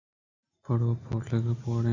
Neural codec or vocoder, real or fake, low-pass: none; real; 7.2 kHz